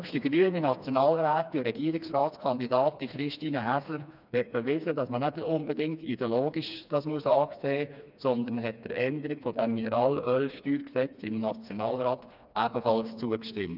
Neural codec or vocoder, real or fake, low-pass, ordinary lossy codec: codec, 16 kHz, 2 kbps, FreqCodec, smaller model; fake; 5.4 kHz; none